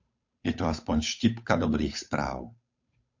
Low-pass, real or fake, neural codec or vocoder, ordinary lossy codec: 7.2 kHz; fake; codec, 16 kHz, 8 kbps, FunCodec, trained on Chinese and English, 25 frames a second; MP3, 48 kbps